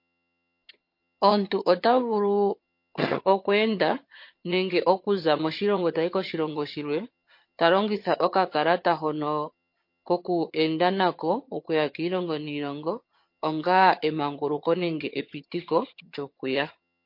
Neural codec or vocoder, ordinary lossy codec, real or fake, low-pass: vocoder, 22.05 kHz, 80 mel bands, HiFi-GAN; MP3, 32 kbps; fake; 5.4 kHz